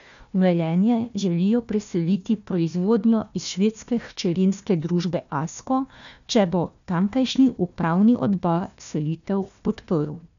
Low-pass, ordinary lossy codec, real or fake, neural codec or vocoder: 7.2 kHz; none; fake; codec, 16 kHz, 1 kbps, FunCodec, trained on Chinese and English, 50 frames a second